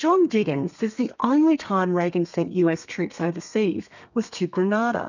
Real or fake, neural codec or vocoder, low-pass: fake; codec, 32 kHz, 1.9 kbps, SNAC; 7.2 kHz